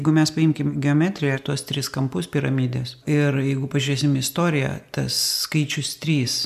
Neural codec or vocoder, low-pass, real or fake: none; 14.4 kHz; real